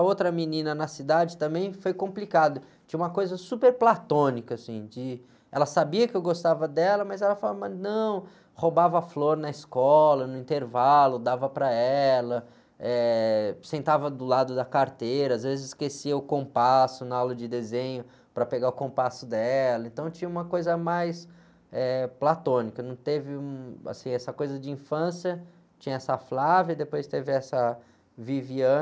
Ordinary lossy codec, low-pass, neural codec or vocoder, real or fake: none; none; none; real